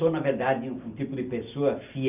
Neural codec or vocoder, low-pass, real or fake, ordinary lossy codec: none; 3.6 kHz; real; none